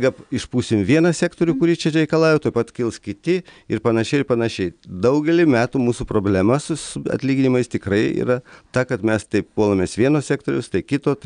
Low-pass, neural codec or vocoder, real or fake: 9.9 kHz; none; real